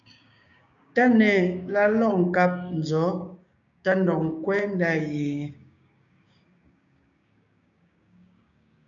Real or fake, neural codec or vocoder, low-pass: fake; codec, 16 kHz, 6 kbps, DAC; 7.2 kHz